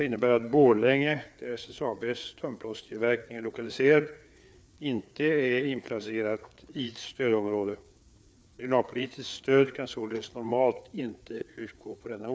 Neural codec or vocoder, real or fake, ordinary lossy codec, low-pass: codec, 16 kHz, 4 kbps, FreqCodec, larger model; fake; none; none